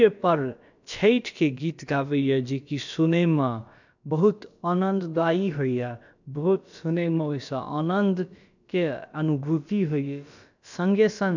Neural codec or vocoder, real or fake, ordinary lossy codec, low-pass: codec, 16 kHz, about 1 kbps, DyCAST, with the encoder's durations; fake; none; 7.2 kHz